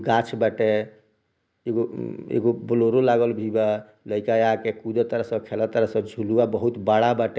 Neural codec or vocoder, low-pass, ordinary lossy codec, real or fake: none; none; none; real